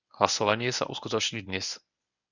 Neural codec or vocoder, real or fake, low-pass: codec, 24 kHz, 0.9 kbps, WavTokenizer, medium speech release version 1; fake; 7.2 kHz